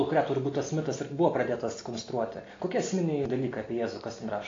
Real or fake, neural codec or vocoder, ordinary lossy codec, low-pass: real; none; AAC, 32 kbps; 7.2 kHz